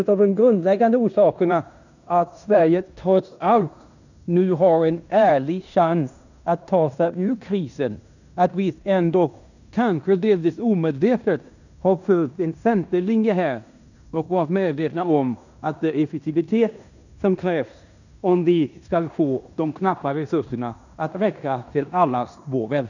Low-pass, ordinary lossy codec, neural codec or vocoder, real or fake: 7.2 kHz; none; codec, 16 kHz in and 24 kHz out, 0.9 kbps, LongCat-Audio-Codec, fine tuned four codebook decoder; fake